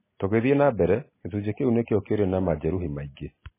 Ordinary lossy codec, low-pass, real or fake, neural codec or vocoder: MP3, 16 kbps; 3.6 kHz; real; none